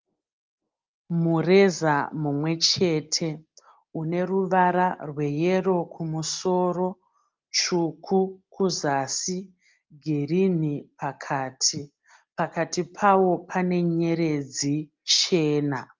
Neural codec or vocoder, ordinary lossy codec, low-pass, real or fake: none; Opus, 24 kbps; 7.2 kHz; real